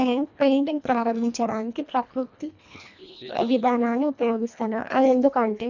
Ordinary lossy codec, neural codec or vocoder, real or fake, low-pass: none; codec, 24 kHz, 1.5 kbps, HILCodec; fake; 7.2 kHz